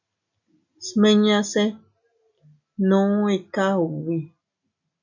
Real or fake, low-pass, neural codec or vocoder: real; 7.2 kHz; none